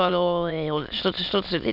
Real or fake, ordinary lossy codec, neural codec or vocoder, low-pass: fake; none; autoencoder, 22.05 kHz, a latent of 192 numbers a frame, VITS, trained on many speakers; 5.4 kHz